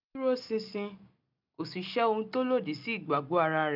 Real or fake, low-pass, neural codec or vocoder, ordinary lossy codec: real; 5.4 kHz; none; none